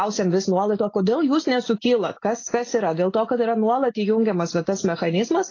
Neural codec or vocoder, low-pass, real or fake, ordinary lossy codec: none; 7.2 kHz; real; AAC, 32 kbps